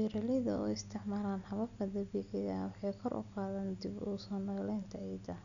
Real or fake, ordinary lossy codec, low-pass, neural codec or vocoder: real; none; 7.2 kHz; none